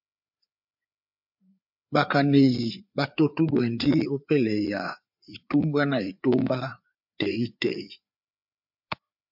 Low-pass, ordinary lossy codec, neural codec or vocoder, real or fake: 5.4 kHz; MP3, 48 kbps; codec, 16 kHz, 4 kbps, FreqCodec, larger model; fake